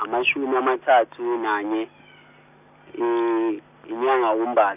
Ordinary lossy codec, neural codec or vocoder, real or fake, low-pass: none; none; real; 3.6 kHz